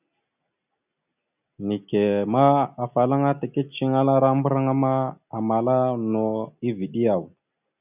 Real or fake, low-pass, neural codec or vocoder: real; 3.6 kHz; none